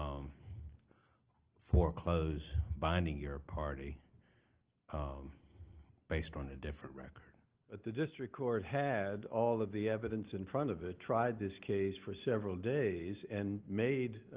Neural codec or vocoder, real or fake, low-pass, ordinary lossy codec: none; real; 3.6 kHz; Opus, 16 kbps